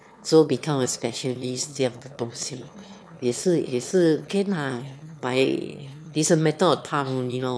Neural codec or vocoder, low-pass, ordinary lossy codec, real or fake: autoencoder, 22.05 kHz, a latent of 192 numbers a frame, VITS, trained on one speaker; none; none; fake